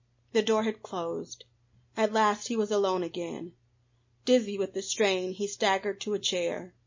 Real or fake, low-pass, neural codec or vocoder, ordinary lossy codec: real; 7.2 kHz; none; MP3, 32 kbps